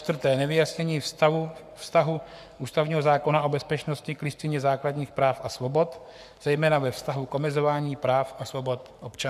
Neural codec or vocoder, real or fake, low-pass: codec, 44.1 kHz, 7.8 kbps, Pupu-Codec; fake; 14.4 kHz